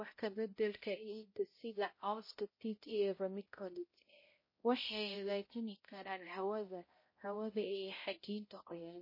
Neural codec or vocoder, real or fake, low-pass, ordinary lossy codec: codec, 16 kHz, 0.5 kbps, X-Codec, HuBERT features, trained on balanced general audio; fake; 5.4 kHz; MP3, 24 kbps